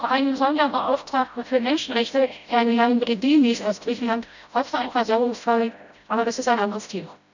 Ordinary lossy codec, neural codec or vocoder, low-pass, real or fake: none; codec, 16 kHz, 0.5 kbps, FreqCodec, smaller model; 7.2 kHz; fake